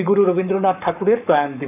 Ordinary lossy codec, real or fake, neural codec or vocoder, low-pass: none; fake; codec, 44.1 kHz, 7.8 kbps, Pupu-Codec; 3.6 kHz